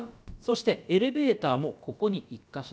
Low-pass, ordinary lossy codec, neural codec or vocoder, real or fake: none; none; codec, 16 kHz, about 1 kbps, DyCAST, with the encoder's durations; fake